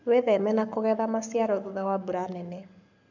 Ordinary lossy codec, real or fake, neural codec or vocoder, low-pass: none; fake; codec, 44.1 kHz, 7.8 kbps, Pupu-Codec; 7.2 kHz